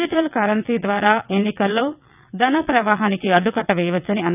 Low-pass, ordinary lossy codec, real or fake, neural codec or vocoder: 3.6 kHz; none; fake; vocoder, 22.05 kHz, 80 mel bands, WaveNeXt